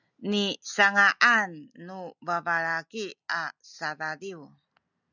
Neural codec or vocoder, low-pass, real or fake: none; 7.2 kHz; real